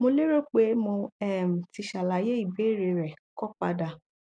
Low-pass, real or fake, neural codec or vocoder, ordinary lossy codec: 9.9 kHz; real; none; MP3, 96 kbps